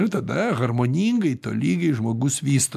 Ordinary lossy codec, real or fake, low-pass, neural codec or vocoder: AAC, 96 kbps; real; 14.4 kHz; none